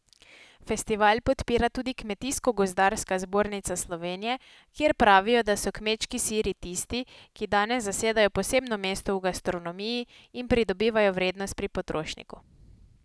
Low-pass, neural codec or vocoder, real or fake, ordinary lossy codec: none; none; real; none